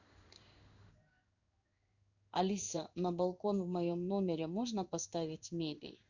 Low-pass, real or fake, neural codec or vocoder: 7.2 kHz; fake; codec, 16 kHz in and 24 kHz out, 1 kbps, XY-Tokenizer